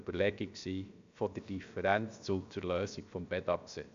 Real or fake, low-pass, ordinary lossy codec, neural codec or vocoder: fake; 7.2 kHz; AAC, 64 kbps; codec, 16 kHz, about 1 kbps, DyCAST, with the encoder's durations